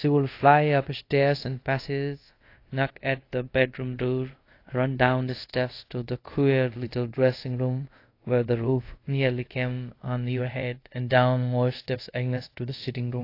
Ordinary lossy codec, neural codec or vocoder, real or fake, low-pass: AAC, 32 kbps; codec, 24 kHz, 0.5 kbps, DualCodec; fake; 5.4 kHz